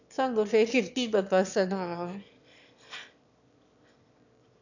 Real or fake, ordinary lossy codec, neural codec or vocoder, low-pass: fake; none; autoencoder, 22.05 kHz, a latent of 192 numbers a frame, VITS, trained on one speaker; 7.2 kHz